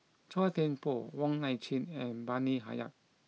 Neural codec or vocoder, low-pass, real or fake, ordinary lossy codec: none; none; real; none